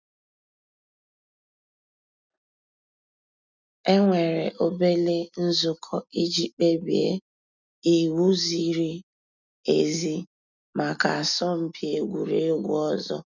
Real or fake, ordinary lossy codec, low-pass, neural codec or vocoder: real; none; 7.2 kHz; none